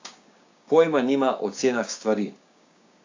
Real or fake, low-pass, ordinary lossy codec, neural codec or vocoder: fake; 7.2 kHz; AAC, 48 kbps; codec, 16 kHz, 6 kbps, DAC